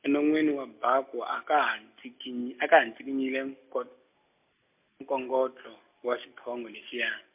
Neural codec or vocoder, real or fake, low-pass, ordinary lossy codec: none; real; 3.6 kHz; MP3, 32 kbps